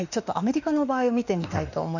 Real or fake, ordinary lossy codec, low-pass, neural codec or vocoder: fake; none; 7.2 kHz; autoencoder, 48 kHz, 32 numbers a frame, DAC-VAE, trained on Japanese speech